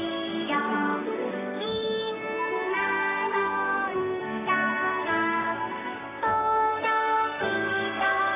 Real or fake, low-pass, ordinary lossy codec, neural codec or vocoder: fake; 3.6 kHz; AAC, 16 kbps; codec, 16 kHz in and 24 kHz out, 1 kbps, XY-Tokenizer